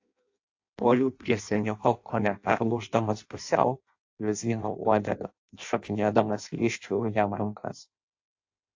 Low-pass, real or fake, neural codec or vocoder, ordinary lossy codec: 7.2 kHz; fake; codec, 16 kHz in and 24 kHz out, 0.6 kbps, FireRedTTS-2 codec; AAC, 48 kbps